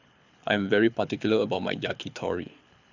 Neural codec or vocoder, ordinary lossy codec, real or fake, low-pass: codec, 24 kHz, 6 kbps, HILCodec; none; fake; 7.2 kHz